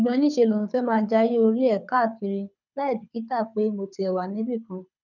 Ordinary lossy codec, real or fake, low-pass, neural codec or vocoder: none; fake; 7.2 kHz; codec, 24 kHz, 6 kbps, HILCodec